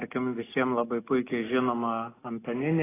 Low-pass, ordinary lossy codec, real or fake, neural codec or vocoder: 3.6 kHz; AAC, 16 kbps; real; none